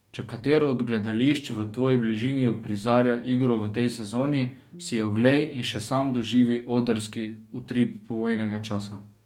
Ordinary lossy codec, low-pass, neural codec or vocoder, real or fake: MP3, 96 kbps; 19.8 kHz; codec, 44.1 kHz, 2.6 kbps, DAC; fake